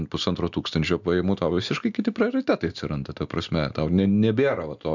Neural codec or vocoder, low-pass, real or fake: none; 7.2 kHz; real